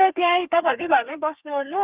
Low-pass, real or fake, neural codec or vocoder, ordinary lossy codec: 3.6 kHz; fake; codec, 32 kHz, 1.9 kbps, SNAC; Opus, 24 kbps